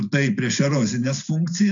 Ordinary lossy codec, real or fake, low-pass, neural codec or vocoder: AAC, 64 kbps; real; 7.2 kHz; none